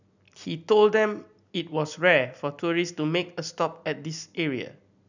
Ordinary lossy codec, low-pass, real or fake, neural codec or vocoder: none; 7.2 kHz; real; none